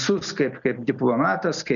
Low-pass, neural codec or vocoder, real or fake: 7.2 kHz; none; real